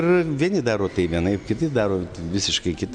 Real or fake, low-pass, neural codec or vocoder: real; 9.9 kHz; none